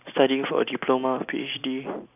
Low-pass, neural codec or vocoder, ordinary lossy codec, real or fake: 3.6 kHz; none; none; real